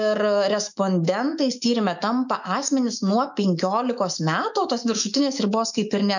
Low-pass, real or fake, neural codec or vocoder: 7.2 kHz; fake; autoencoder, 48 kHz, 128 numbers a frame, DAC-VAE, trained on Japanese speech